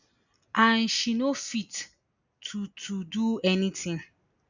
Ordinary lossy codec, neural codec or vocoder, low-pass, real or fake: none; none; 7.2 kHz; real